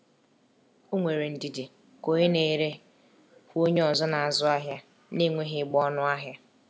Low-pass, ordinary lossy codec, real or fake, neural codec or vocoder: none; none; real; none